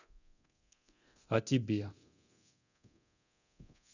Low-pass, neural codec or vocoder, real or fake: 7.2 kHz; codec, 24 kHz, 0.9 kbps, DualCodec; fake